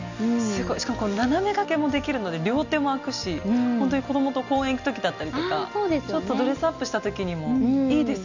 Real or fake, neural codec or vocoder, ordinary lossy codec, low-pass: real; none; none; 7.2 kHz